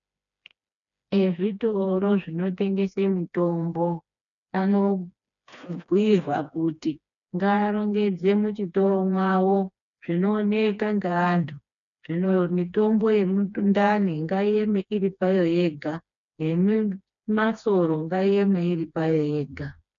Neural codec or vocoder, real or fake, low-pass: codec, 16 kHz, 2 kbps, FreqCodec, smaller model; fake; 7.2 kHz